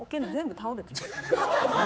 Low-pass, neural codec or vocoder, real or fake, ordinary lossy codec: none; codec, 16 kHz, 4 kbps, X-Codec, HuBERT features, trained on general audio; fake; none